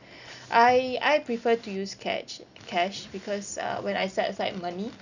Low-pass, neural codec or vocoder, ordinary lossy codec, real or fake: 7.2 kHz; none; none; real